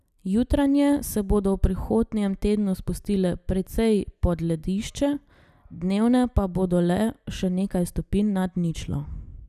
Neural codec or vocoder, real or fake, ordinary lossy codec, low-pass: none; real; none; 14.4 kHz